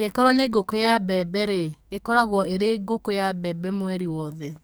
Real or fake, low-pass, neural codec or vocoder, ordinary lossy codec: fake; none; codec, 44.1 kHz, 2.6 kbps, SNAC; none